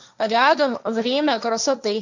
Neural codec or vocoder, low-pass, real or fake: codec, 16 kHz, 1.1 kbps, Voila-Tokenizer; 7.2 kHz; fake